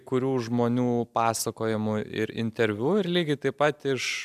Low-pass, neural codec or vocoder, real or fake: 14.4 kHz; none; real